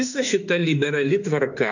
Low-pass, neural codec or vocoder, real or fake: 7.2 kHz; autoencoder, 48 kHz, 32 numbers a frame, DAC-VAE, trained on Japanese speech; fake